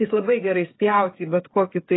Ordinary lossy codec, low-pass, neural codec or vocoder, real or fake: AAC, 16 kbps; 7.2 kHz; codec, 16 kHz, 16 kbps, FreqCodec, smaller model; fake